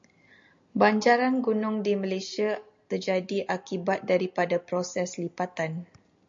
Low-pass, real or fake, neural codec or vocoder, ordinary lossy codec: 7.2 kHz; real; none; MP3, 48 kbps